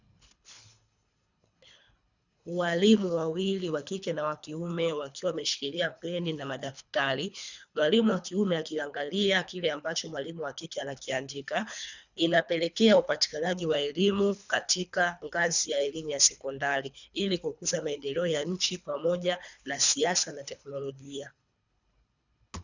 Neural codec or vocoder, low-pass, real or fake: codec, 24 kHz, 3 kbps, HILCodec; 7.2 kHz; fake